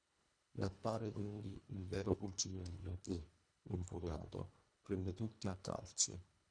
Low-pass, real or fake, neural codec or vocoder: 9.9 kHz; fake; codec, 24 kHz, 1.5 kbps, HILCodec